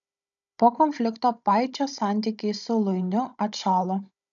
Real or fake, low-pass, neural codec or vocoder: fake; 7.2 kHz; codec, 16 kHz, 16 kbps, FunCodec, trained on Chinese and English, 50 frames a second